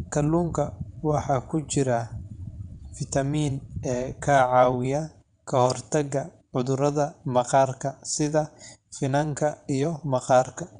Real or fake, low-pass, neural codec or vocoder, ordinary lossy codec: fake; 9.9 kHz; vocoder, 22.05 kHz, 80 mel bands, WaveNeXt; none